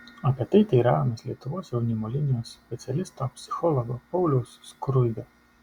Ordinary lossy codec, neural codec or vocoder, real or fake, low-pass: Opus, 64 kbps; none; real; 19.8 kHz